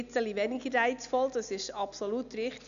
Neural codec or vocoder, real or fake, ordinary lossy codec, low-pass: none; real; none; 7.2 kHz